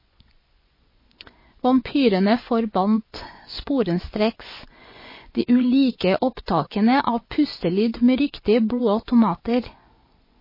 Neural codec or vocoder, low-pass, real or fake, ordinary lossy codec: vocoder, 24 kHz, 100 mel bands, Vocos; 5.4 kHz; fake; MP3, 24 kbps